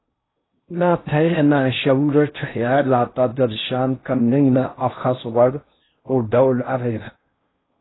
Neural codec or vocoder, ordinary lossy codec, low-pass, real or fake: codec, 16 kHz in and 24 kHz out, 0.6 kbps, FocalCodec, streaming, 4096 codes; AAC, 16 kbps; 7.2 kHz; fake